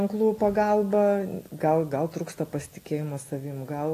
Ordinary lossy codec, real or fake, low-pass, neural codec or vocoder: AAC, 48 kbps; real; 14.4 kHz; none